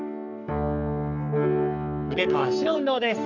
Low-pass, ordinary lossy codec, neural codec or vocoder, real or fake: 7.2 kHz; none; codec, 44.1 kHz, 3.4 kbps, Pupu-Codec; fake